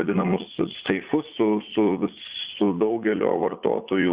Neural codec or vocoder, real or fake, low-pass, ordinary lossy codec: vocoder, 44.1 kHz, 80 mel bands, Vocos; fake; 3.6 kHz; Opus, 64 kbps